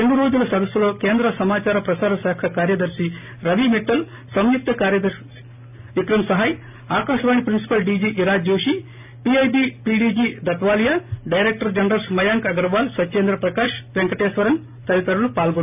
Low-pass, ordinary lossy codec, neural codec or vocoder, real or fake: 3.6 kHz; none; none; real